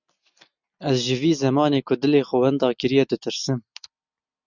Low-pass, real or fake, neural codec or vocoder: 7.2 kHz; real; none